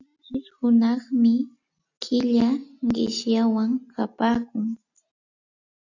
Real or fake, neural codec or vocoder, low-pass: real; none; 7.2 kHz